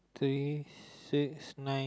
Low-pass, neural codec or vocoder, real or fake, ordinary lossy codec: none; none; real; none